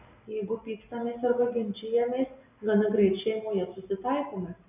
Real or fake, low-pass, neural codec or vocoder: real; 3.6 kHz; none